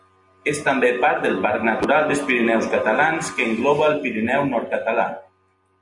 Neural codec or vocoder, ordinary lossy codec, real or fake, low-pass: none; MP3, 64 kbps; real; 10.8 kHz